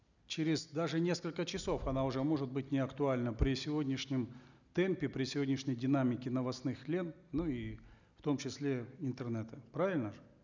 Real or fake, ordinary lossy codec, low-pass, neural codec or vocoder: real; none; 7.2 kHz; none